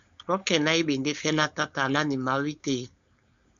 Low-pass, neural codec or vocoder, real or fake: 7.2 kHz; codec, 16 kHz, 4.8 kbps, FACodec; fake